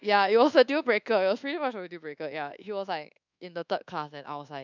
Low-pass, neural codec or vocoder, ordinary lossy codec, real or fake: 7.2 kHz; codec, 24 kHz, 1.2 kbps, DualCodec; none; fake